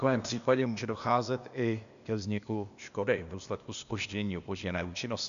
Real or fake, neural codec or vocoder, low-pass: fake; codec, 16 kHz, 0.8 kbps, ZipCodec; 7.2 kHz